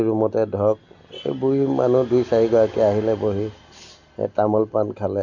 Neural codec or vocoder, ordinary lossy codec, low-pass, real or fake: none; none; 7.2 kHz; real